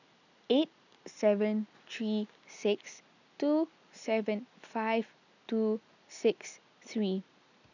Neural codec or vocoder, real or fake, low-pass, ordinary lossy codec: none; real; 7.2 kHz; none